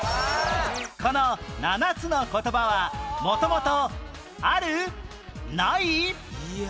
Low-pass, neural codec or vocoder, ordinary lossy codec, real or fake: none; none; none; real